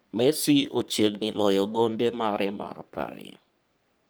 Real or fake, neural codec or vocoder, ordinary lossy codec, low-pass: fake; codec, 44.1 kHz, 3.4 kbps, Pupu-Codec; none; none